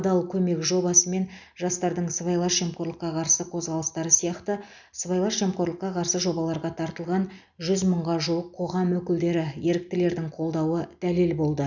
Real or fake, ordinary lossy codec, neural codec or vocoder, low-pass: real; none; none; 7.2 kHz